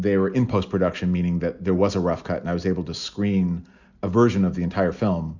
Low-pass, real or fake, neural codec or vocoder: 7.2 kHz; real; none